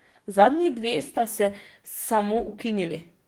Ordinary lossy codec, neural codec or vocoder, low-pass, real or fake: Opus, 24 kbps; codec, 44.1 kHz, 2.6 kbps, DAC; 19.8 kHz; fake